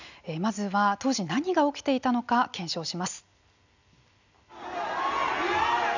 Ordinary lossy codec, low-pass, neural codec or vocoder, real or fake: none; 7.2 kHz; none; real